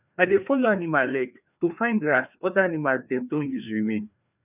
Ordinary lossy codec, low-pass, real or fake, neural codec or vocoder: none; 3.6 kHz; fake; codec, 16 kHz, 2 kbps, FreqCodec, larger model